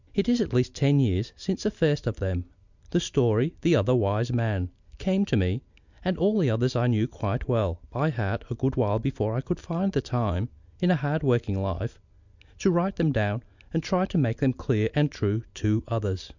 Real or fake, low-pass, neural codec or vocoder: real; 7.2 kHz; none